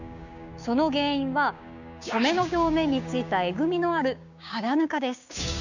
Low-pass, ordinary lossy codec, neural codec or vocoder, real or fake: 7.2 kHz; none; codec, 16 kHz, 6 kbps, DAC; fake